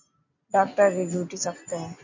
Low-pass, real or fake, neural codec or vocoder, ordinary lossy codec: 7.2 kHz; real; none; MP3, 64 kbps